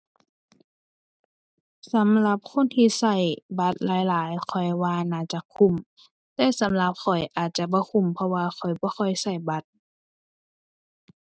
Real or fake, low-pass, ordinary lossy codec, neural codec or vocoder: real; none; none; none